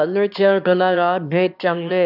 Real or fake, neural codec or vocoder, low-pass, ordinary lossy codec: fake; autoencoder, 22.05 kHz, a latent of 192 numbers a frame, VITS, trained on one speaker; 5.4 kHz; none